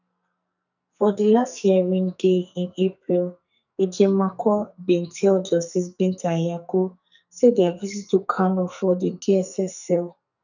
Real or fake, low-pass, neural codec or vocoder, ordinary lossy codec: fake; 7.2 kHz; codec, 44.1 kHz, 2.6 kbps, SNAC; none